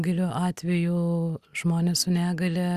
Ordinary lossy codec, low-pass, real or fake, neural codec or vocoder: Opus, 64 kbps; 14.4 kHz; real; none